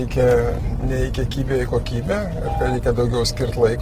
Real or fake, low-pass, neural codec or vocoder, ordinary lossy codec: real; 14.4 kHz; none; Opus, 16 kbps